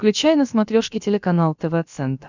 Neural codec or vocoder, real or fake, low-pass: codec, 16 kHz, about 1 kbps, DyCAST, with the encoder's durations; fake; 7.2 kHz